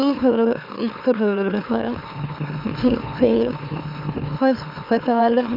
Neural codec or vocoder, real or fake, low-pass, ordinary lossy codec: autoencoder, 44.1 kHz, a latent of 192 numbers a frame, MeloTTS; fake; 5.4 kHz; none